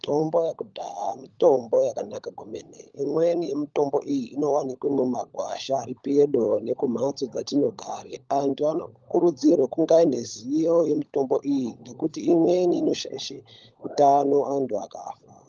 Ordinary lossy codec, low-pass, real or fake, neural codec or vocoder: Opus, 32 kbps; 7.2 kHz; fake; codec, 16 kHz, 16 kbps, FunCodec, trained on LibriTTS, 50 frames a second